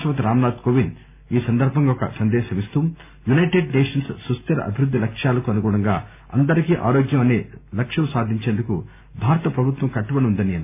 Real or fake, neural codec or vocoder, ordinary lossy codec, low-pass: real; none; MP3, 32 kbps; 3.6 kHz